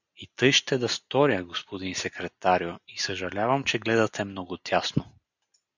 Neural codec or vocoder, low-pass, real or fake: none; 7.2 kHz; real